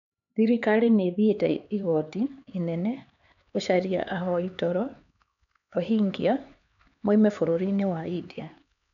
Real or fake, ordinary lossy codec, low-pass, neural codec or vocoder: fake; none; 7.2 kHz; codec, 16 kHz, 4 kbps, X-Codec, HuBERT features, trained on LibriSpeech